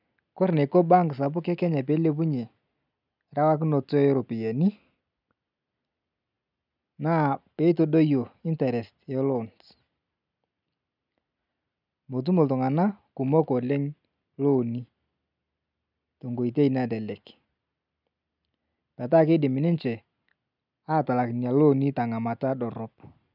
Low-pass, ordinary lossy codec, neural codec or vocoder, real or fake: 5.4 kHz; none; none; real